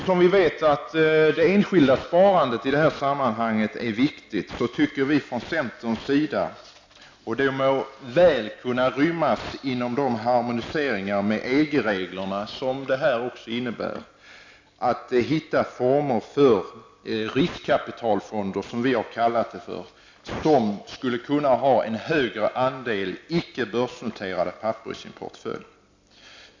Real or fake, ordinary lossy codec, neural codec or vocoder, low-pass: real; MP3, 64 kbps; none; 7.2 kHz